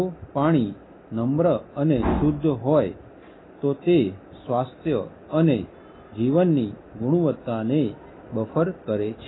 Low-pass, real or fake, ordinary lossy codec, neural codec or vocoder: 7.2 kHz; real; AAC, 16 kbps; none